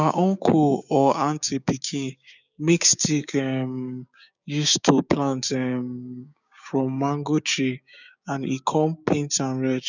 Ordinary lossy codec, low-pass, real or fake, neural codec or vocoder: none; 7.2 kHz; fake; codec, 16 kHz, 6 kbps, DAC